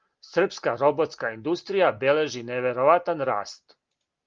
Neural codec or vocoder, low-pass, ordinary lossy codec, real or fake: none; 7.2 kHz; Opus, 32 kbps; real